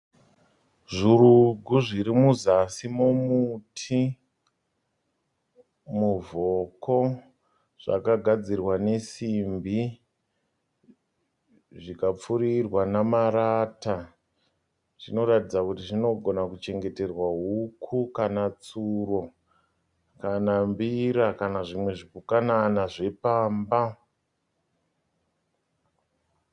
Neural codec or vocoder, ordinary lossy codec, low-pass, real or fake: none; Opus, 64 kbps; 10.8 kHz; real